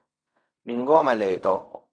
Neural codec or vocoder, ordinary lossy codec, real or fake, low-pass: codec, 16 kHz in and 24 kHz out, 0.4 kbps, LongCat-Audio-Codec, fine tuned four codebook decoder; AAC, 64 kbps; fake; 9.9 kHz